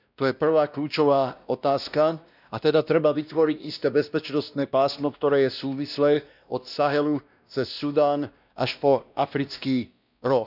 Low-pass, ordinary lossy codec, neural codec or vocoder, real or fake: 5.4 kHz; none; codec, 16 kHz, 1 kbps, X-Codec, WavLM features, trained on Multilingual LibriSpeech; fake